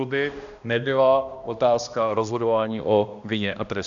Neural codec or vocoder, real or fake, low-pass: codec, 16 kHz, 1 kbps, X-Codec, HuBERT features, trained on balanced general audio; fake; 7.2 kHz